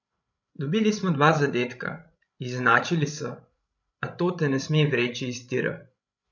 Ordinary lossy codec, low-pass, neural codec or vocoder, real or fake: none; 7.2 kHz; codec, 16 kHz, 16 kbps, FreqCodec, larger model; fake